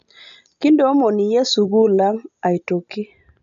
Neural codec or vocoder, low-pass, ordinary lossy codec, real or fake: none; 7.2 kHz; AAC, 96 kbps; real